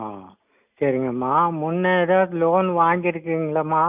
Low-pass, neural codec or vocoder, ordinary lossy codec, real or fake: 3.6 kHz; none; none; real